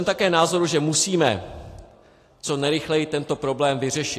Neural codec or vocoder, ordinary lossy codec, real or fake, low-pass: none; AAC, 48 kbps; real; 14.4 kHz